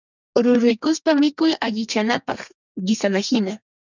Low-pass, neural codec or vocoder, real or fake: 7.2 kHz; codec, 32 kHz, 1.9 kbps, SNAC; fake